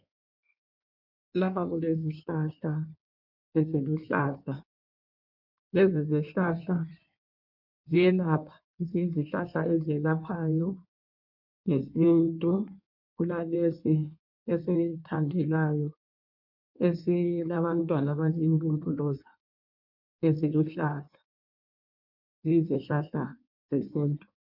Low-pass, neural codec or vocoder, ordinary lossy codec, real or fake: 5.4 kHz; codec, 16 kHz in and 24 kHz out, 1.1 kbps, FireRedTTS-2 codec; MP3, 48 kbps; fake